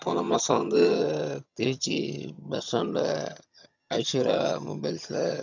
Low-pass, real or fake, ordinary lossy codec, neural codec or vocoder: 7.2 kHz; fake; none; vocoder, 22.05 kHz, 80 mel bands, HiFi-GAN